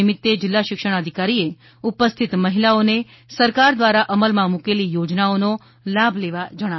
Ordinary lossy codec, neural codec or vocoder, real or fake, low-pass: MP3, 24 kbps; none; real; 7.2 kHz